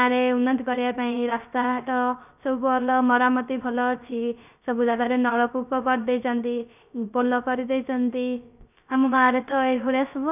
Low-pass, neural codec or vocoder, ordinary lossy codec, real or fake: 3.6 kHz; codec, 16 kHz, 0.3 kbps, FocalCodec; none; fake